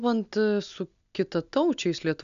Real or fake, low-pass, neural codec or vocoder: real; 7.2 kHz; none